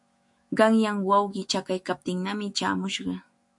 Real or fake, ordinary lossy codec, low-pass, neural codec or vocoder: fake; MP3, 48 kbps; 10.8 kHz; autoencoder, 48 kHz, 128 numbers a frame, DAC-VAE, trained on Japanese speech